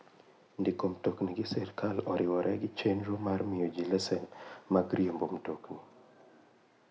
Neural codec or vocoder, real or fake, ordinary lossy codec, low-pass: none; real; none; none